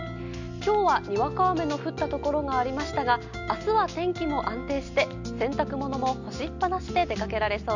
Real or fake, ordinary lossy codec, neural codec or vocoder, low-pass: real; none; none; 7.2 kHz